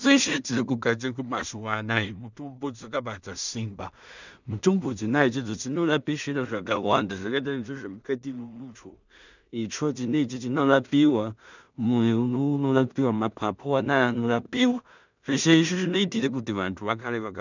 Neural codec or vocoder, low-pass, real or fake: codec, 16 kHz in and 24 kHz out, 0.4 kbps, LongCat-Audio-Codec, two codebook decoder; 7.2 kHz; fake